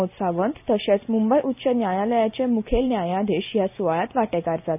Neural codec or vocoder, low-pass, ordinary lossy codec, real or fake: none; 3.6 kHz; none; real